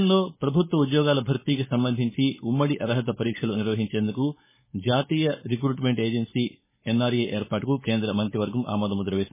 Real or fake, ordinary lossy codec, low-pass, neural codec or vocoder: fake; MP3, 16 kbps; 3.6 kHz; codec, 16 kHz, 4.8 kbps, FACodec